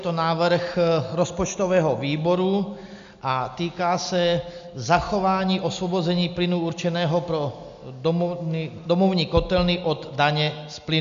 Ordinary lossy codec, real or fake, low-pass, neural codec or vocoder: MP3, 64 kbps; real; 7.2 kHz; none